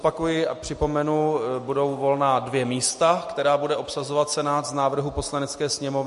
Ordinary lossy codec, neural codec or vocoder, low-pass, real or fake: MP3, 48 kbps; none; 14.4 kHz; real